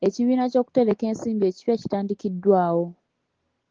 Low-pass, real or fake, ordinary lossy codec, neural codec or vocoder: 7.2 kHz; real; Opus, 16 kbps; none